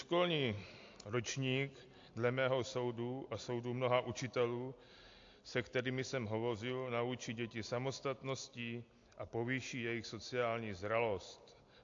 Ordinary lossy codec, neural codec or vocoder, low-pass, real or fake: AAC, 48 kbps; none; 7.2 kHz; real